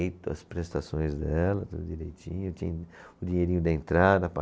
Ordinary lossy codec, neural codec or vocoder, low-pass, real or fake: none; none; none; real